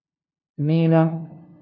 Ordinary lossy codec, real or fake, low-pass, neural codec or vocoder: MP3, 48 kbps; fake; 7.2 kHz; codec, 16 kHz, 0.5 kbps, FunCodec, trained on LibriTTS, 25 frames a second